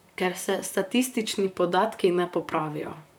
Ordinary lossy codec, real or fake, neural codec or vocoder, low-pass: none; fake; vocoder, 44.1 kHz, 128 mel bands, Pupu-Vocoder; none